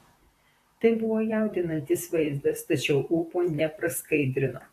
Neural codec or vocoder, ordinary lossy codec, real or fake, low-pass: vocoder, 44.1 kHz, 128 mel bands, Pupu-Vocoder; AAC, 64 kbps; fake; 14.4 kHz